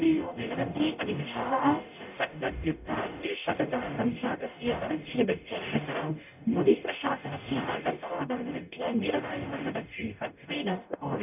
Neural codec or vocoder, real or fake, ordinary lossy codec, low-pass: codec, 44.1 kHz, 0.9 kbps, DAC; fake; none; 3.6 kHz